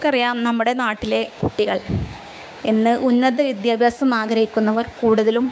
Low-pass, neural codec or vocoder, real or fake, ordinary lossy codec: none; codec, 16 kHz, 6 kbps, DAC; fake; none